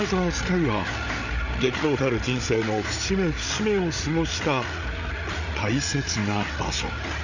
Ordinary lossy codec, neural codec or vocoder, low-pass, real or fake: none; codec, 16 kHz, 8 kbps, FreqCodec, larger model; 7.2 kHz; fake